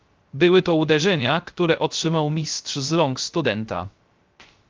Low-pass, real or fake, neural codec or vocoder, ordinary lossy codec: 7.2 kHz; fake; codec, 16 kHz, 0.3 kbps, FocalCodec; Opus, 32 kbps